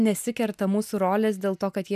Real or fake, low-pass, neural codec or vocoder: real; 14.4 kHz; none